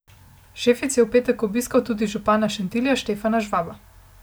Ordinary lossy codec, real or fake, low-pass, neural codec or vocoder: none; real; none; none